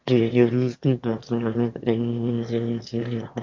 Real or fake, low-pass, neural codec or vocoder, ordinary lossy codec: fake; 7.2 kHz; autoencoder, 22.05 kHz, a latent of 192 numbers a frame, VITS, trained on one speaker; MP3, 48 kbps